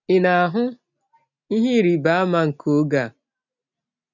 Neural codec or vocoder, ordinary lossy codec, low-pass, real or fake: none; none; 7.2 kHz; real